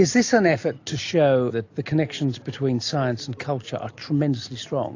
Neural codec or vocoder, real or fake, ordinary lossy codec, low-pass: none; real; AAC, 48 kbps; 7.2 kHz